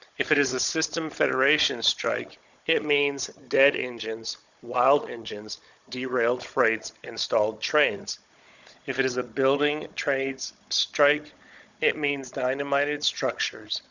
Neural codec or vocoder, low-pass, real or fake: codec, 16 kHz, 16 kbps, FunCodec, trained on Chinese and English, 50 frames a second; 7.2 kHz; fake